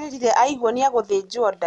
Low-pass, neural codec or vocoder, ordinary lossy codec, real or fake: 10.8 kHz; none; Opus, 64 kbps; real